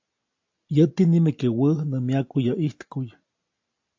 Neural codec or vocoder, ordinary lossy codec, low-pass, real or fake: none; AAC, 48 kbps; 7.2 kHz; real